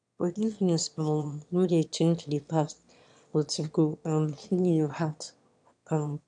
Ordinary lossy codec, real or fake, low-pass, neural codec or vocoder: none; fake; 9.9 kHz; autoencoder, 22.05 kHz, a latent of 192 numbers a frame, VITS, trained on one speaker